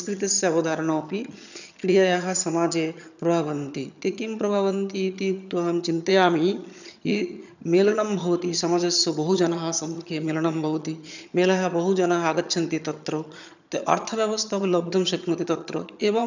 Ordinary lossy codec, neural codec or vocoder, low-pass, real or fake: none; vocoder, 22.05 kHz, 80 mel bands, HiFi-GAN; 7.2 kHz; fake